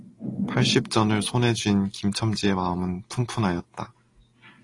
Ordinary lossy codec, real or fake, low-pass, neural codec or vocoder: AAC, 32 kbps; real; 10.8 kHz; none